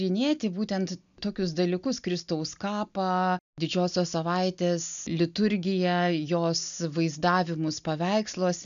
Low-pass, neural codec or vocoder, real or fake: 7.2 kHz; none; real